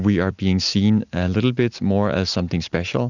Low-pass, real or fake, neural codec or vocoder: 7.2 kHz; real; none